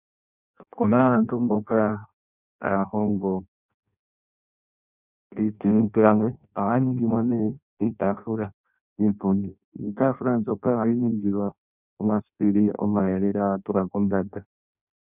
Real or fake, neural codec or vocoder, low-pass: fake; codec, 16 kHz in and 24 kHz out, 0.6 kbps, FireRedTTS-2 codec; 3.6 kHz